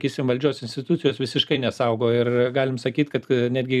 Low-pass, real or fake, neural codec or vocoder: 14.4 kHz; fake; vocoder, 44.1 kHz, 128 mel bands every 256 samples, BigVGAN v2